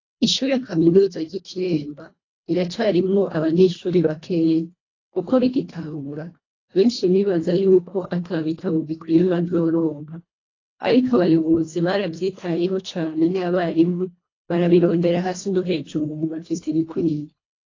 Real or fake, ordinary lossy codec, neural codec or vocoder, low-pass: fake; AAC, 32 kbps; codec, 24 kHz, 1.5 kbps, HILCodec; 7.2 kHz